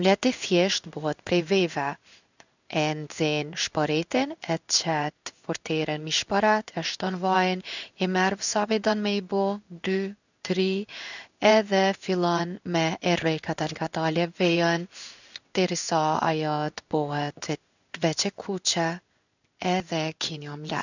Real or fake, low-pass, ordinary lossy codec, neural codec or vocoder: fake; 7.2 kHz; none; codec, 16 kHz in and 24 kHz out, 1 kbps, XY-Tokenizer